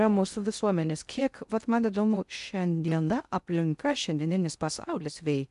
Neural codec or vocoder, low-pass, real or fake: codec, 16 kHz in and 24 kHz out, 0.6 kbps, FocalCodec, streaming, 2048 codes; 10.8 kHz; fake